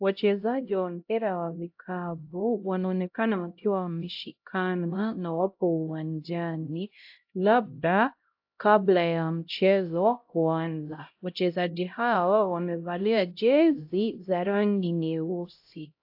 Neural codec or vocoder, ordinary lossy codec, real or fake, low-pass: codec, 16 kHz, 0.5 kbps, X-Codec, HuBERT features, trained on LibriSpeech; AAC, 48 kbps; fake; 5.4 kHz